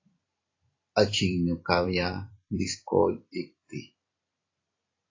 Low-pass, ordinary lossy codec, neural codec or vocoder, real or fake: 7.2 kHz; AAC, 32 kbps; vocoder, 44.1 kHz, 128 mel bands every 256 samples, BigVGAN v2; fake